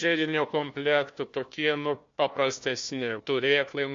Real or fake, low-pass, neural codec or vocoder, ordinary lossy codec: fake; 7.2 kHz; codec, 16 kHz, 1 kbps, FunCodec, trained on Chinese and English, 50 frames a second; MP3, 48 kbps